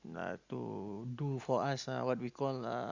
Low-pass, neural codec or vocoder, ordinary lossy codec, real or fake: 7.2 kHz; none; Opus, 64 kbps; real